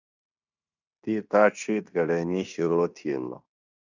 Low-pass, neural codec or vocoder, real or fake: 7.2 kHz; codec, 16 kHz in and 24 kHz out, 0.9 kbps, LongCat-Audio-Codec, fine tuned four codebook decoder; fake